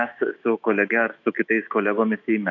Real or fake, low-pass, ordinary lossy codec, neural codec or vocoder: fake; 7.2 kHz; AAC, 48 kbps; autoencoder, 48 kHz, 128 numbers a frame, DAC-VAE, trained on Japanese speech